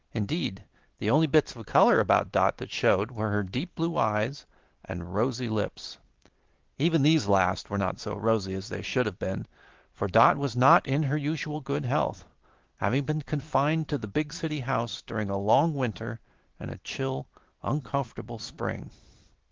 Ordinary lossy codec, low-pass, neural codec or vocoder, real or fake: Opus, 16 kbps; 7.2 kHz; none; real